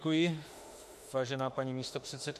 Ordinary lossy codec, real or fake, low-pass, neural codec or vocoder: MP3, 64 kbps; fake; 14.4 kHz; autoencoder, 48 kHz, 32 numbers a frame, DAC-VAE, trained on Japanese speech